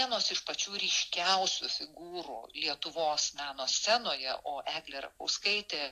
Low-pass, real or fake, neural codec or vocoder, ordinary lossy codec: 14.4 kHz; real; none; AAC, 64 kbps